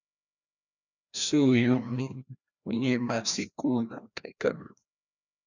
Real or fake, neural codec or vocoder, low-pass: fake; codec, 16 kHz, 1 kbps, FreqCodec, larger model; 7.2 kHz